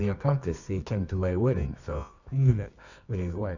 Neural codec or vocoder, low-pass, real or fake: codec, 24 kHz, 0.9 kbps, WavTokenizer, medium music audio release; 7.2 kHz; fake